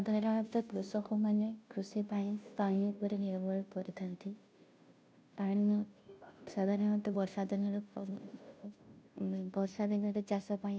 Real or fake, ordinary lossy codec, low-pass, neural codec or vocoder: fake; none; none; codec, 16 kHz, 0.5 kbps, FunCodec, trained on Chinese and English, 25 frames a second